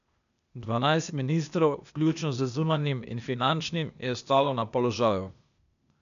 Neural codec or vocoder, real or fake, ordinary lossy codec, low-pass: codec, 16 kHz, 0.8 kbps, ZipCodec; fake; none; 7.2 kHz